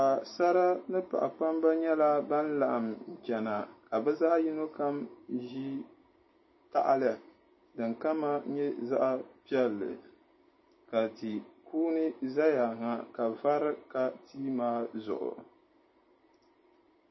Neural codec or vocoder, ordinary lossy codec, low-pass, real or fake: none; MP3, 24 kbps; 7.2 kHz; real